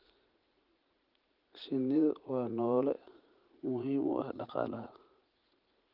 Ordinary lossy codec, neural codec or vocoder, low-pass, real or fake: Opus, 24 kbps; vocoder, 44.1 kHz, 80 mel bands, Vocos; 5.4 kHz; fake